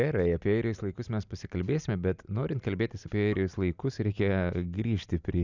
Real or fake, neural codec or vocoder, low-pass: real; none; 7.2 kHz